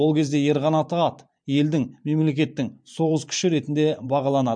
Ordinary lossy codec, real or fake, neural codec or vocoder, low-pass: MP3, 64 kbps; fake; vocoder, 44.1 kHz, 128 mel bands every 512 samples, BigVGAN v2; 9.9 kHz